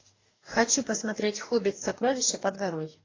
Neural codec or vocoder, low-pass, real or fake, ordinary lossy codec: codec, 44.1 kHz, 2.6 kbps, DAC; 7.2 kHz; fake; AAC, 32 kbps